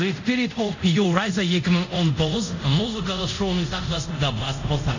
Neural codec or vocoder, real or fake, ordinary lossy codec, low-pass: codec, 24 kHz, 0.5 kbps, DualCodec; fake; none; 7.2 kHz